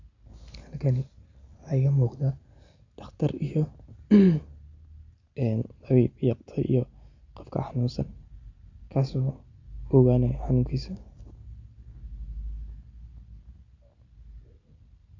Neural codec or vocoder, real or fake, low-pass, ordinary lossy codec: none; real; 7.2 kHz; Opus, 64 kbps